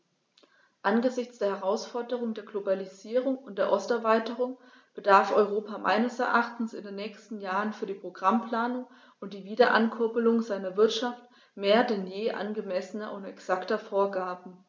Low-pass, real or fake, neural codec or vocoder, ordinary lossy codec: none; real; none; none